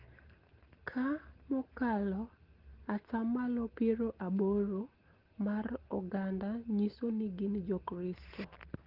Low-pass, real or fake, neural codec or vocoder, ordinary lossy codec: 5.4 kHz; real; none; Opus, 16 kbps